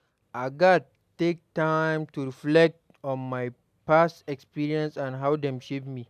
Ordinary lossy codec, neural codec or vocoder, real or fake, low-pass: MP3, 96 kbps; none; real; 14.4 kHz